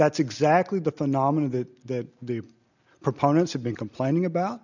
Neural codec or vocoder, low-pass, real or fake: none; 7.2 kHz; real